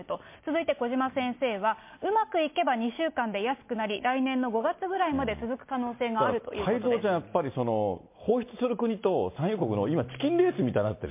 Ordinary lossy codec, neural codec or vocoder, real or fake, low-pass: MP3, 24 kbps; none; real; 3.6 kHz